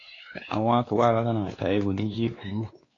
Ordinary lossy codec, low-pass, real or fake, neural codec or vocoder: AAC, 32 kbps; 7.2 kHz; fake; codec, 16 kHz, 2 kbps, X-Codec, WavLM features, trained on Multilingual LibriSpeech